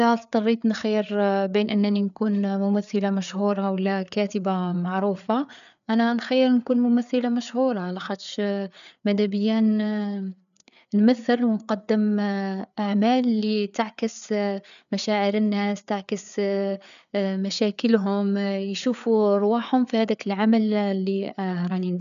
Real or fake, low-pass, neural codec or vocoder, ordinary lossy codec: fake; 7.2 kHz; codec, 16 kHz, 4 kbps, FreqCodec, larger model; none